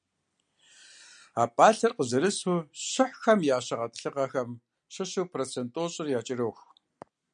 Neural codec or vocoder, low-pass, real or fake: none; 9.9 kHz; real